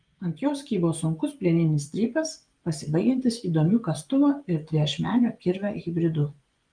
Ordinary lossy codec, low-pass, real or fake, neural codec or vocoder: Opus, 32 kbps; 9.9 kHz; fake; vocoder, 24 kHz, 100 mel bands, Vocos